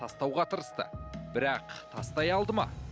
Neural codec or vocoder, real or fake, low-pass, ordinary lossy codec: none; real; none; none